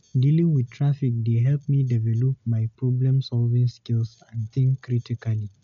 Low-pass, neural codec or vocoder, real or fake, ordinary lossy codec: 7.2 kHz; none; real; none